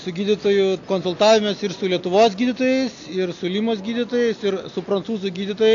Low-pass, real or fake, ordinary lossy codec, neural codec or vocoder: 7.2 kHz; real; AAC, 48 kbps; none